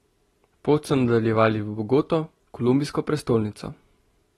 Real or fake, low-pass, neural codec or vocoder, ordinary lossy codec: real; 19.8 kHz; none; AAC, 32 kbps